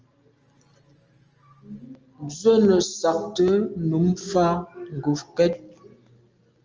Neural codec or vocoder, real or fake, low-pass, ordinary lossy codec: none; real; 7.2 kHz; Opus, 24 kbps